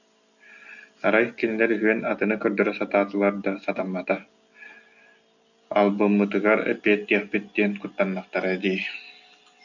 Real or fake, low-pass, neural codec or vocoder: real; 7.2 kHz; none